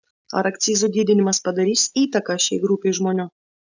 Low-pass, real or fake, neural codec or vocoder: 7.2 kHz; real; none